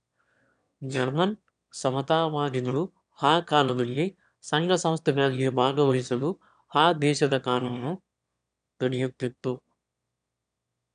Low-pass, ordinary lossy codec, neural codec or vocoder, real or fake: 9.9 kHz; none; autoencoder, 22.05 kHz, a latent of 192 numbers a frame, VITS, trained on one speaker; fake